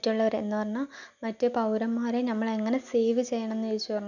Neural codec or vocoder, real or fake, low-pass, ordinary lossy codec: none; real; 7.2 kHz; none